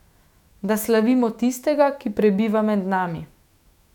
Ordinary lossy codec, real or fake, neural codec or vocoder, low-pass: none; fake; autoencoder, 48 kHz, 128 numbers a frame, DAC-VAE, trained on Japanese speech; 19.8 kHz